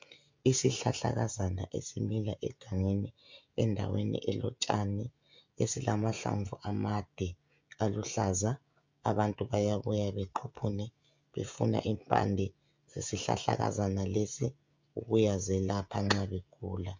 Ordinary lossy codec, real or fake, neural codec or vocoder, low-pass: MP3, 64 kbps; fake; codec, 16 kHz, 16 kbps, FreqCodec, smaller model; 7.2 kHz